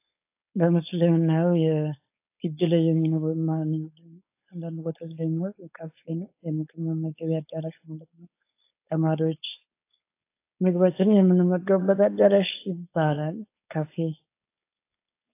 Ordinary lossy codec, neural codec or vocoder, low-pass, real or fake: AAC, 24 kbps; codec, 16 kHz, 4.8 kbps, FACodec; 3.6 kHz; fake